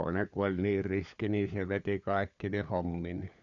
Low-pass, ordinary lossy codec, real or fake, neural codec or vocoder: 7.2 kHz; none; fake; codec, 16 kHz, 4 kbps, FunCodec, trained on LibriTTS, 50 frames a second